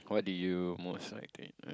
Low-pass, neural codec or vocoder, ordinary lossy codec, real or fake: none; none; none; real